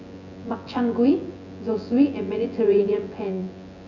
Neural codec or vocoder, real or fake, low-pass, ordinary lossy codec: vocoder, 24 kHz, 100 mel bands, Vocos; fake; 7.2 kHz; none